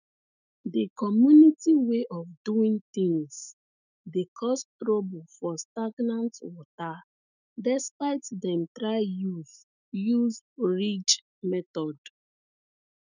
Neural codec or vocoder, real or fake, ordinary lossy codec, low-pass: autoencoder, 48 kHz, 128 numbers a frame, DAC-VAE, trained on Japanese speech; fake; none; 7.2 kHz